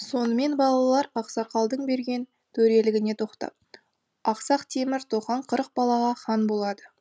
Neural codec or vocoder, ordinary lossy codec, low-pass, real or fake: none; none; none; real